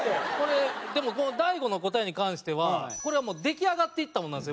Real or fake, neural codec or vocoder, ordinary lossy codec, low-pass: real; none; none; none